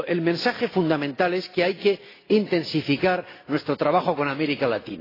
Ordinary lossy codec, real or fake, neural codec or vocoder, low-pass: AAC, 24 kbps; real; none; 5.4 kHz